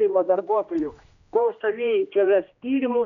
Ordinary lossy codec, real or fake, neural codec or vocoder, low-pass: MP3, 96 kbps; fake; codec, 16 kHz, 1 kbps, X-Codec, HuBERT features, trained on general audio; 7.2 kHz